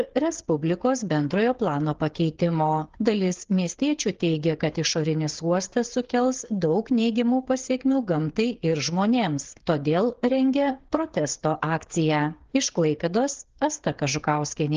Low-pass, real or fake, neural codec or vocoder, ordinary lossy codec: 7.2 kHz; fake; codec, 16 kHz, 8 kbps, FreqCodec, smaller model; Opus, 16 kbps